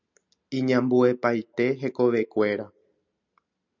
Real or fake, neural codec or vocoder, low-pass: real; none; 7.2 kHz